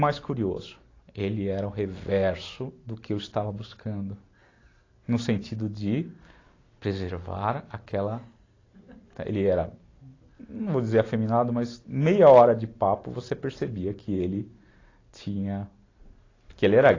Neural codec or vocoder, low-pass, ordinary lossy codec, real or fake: none; 7.2 kHz; AAC, 32 kbps; real